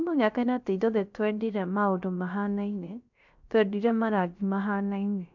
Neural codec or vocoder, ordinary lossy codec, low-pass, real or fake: codec, 16 kHz, 0.3 kbps, FocalCodec; none; 7.2 kHz; fake